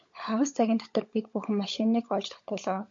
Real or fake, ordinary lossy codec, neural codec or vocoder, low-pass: fake; MP3, 48 kbps; codec, 16 kHz, 16 kbps, FunCodec, trained on LibriTTS, 50 frames a second; 7.2 kHz